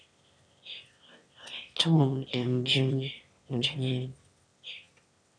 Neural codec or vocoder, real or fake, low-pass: autoencoder, 22.05 kHz, a latent of 192 numbers a frame, VITS, trained on one speaker; fake; 9.9 kHz